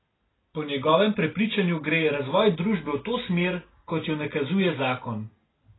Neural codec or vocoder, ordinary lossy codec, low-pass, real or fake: none; AAC, 16 kbps; 7.2 kHz; real